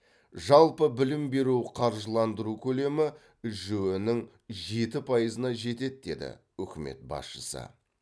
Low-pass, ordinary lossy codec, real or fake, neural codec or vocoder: none; none; real; none